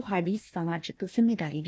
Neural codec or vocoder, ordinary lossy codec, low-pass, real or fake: codec, 16 kHz, 1 kbps, FunCodec, trained on Chinese and English, 50 frames a second; none; none; fake